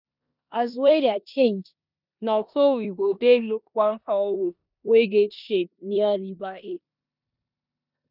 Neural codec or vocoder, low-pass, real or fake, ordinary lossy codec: codec, 16 kHz in and 24 kHz out, 0.9 kbps, LongCat-Audio-Codec, four codebook decoder; 5.4 kHz; fake; none